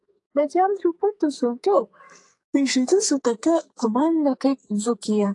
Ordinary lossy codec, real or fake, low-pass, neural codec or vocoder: AAC, 48 kbps; fake; 10.8 kHz; codec, 44.1 kHz, 2.6 kbps, SNAC